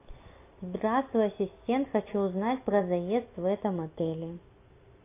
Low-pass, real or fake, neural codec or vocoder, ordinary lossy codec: 3.6 kHz; real; none; AAC, 24 kbps